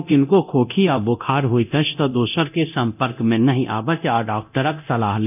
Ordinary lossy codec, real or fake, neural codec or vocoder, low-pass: none; fake; codec, 24 kHz, 0.9 kbps, DualCodec; 3.6 kHz